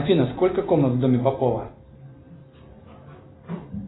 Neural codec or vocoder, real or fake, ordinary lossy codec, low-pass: none; real; AAC, 16 kbps; 7.2 kHz